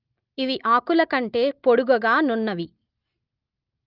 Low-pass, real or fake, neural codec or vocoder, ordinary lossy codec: 5.4 kHz; real; none; Opus, 32 kbps